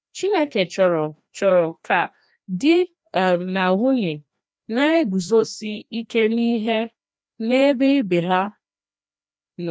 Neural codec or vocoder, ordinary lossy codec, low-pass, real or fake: codec, 16 kHz, 1 kbps, FreqCodec, larger model; none; none; fake